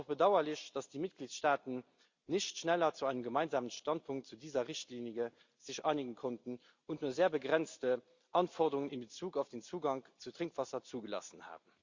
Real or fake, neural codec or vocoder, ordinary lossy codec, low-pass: real; none; Opus, 64 kbps; 7.2 kHz